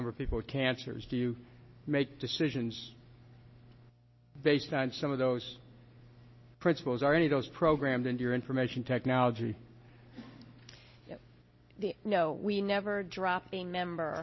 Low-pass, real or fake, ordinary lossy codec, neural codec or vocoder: 7.2 kHz; real; MP3, 24 kbps; none